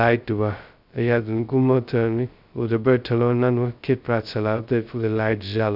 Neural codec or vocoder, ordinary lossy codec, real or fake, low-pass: codec, 16 kHz, 0.2 kbps, FocalCodec; none; fake; 5.4 kHz